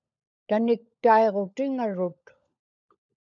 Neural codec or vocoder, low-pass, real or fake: codec, 16 kHz, 16 kbps, FunCodec, trained on LibriTTS, 50 frames a second; 7.2 kHz; fake